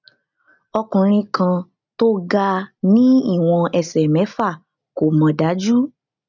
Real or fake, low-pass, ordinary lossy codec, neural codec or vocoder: real; 7.2 kHz; none; none